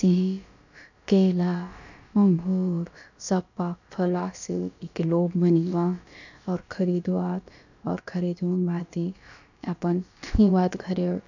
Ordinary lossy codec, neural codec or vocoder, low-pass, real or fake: none; codec, 16 kHz, about 1 kbps, DyCAST, with the encoder's durations; 7.2 kHz; fake